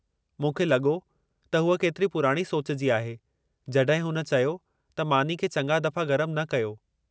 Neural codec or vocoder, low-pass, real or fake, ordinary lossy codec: none; none; real; none